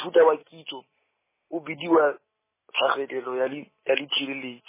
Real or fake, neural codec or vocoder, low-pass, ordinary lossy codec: real; none; 3.6 kHz; MP3, 16 kbps